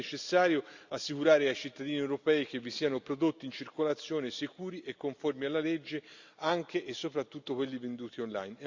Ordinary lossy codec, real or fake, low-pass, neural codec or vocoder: Opus, 64 kbps; real; 7.2 kHz; none